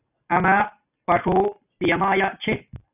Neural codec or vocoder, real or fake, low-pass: none; real; 3.6 kHz